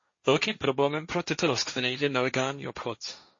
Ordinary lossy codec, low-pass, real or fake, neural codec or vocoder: MP3, 32 kbps; 7.2 kHz; fake; codec, 16 kHz, 1.1 kbps, Voila-Tokenizer